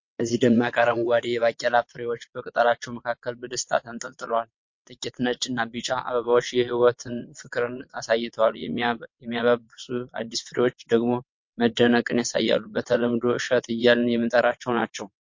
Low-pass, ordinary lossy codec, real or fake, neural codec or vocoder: 7.2 kHz; MP3, 48 kbps; fake; vocoder, 22.05 kHz, 80 mel bands, WaveNeXt